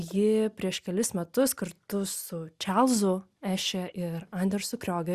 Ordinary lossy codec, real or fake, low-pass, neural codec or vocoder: Opus, 64 kbps; real; 14.4 kHz; none